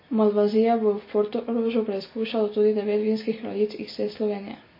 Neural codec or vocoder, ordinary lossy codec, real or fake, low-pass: none; MP3, 24 kbps; real; 5.4 kHz